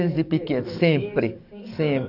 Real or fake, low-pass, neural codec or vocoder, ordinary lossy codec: fake; 5.4 kHz; codec, 44.1 kHz, 7.8 kbps, DAC; none